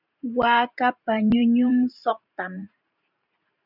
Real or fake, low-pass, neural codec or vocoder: fake; 5.4 kHz; vocoder, 44.1 kHz, 128 mel bands every 512 samples, BigVGAN v2